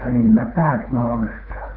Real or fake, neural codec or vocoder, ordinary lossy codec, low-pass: fake; codec, 24 kHz, 3 kbps, HILCodec; none; 5.4 kHz